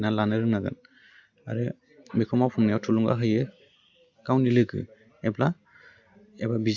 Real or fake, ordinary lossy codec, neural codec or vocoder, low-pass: real; Opus, 64 kbps; none; 7.2 kHz